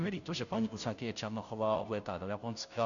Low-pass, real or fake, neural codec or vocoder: 7.2 kHz; fake; codec, 16 kHz, 0.5 kbps, FunCodec, trained on Chinese and English, 25 frames a second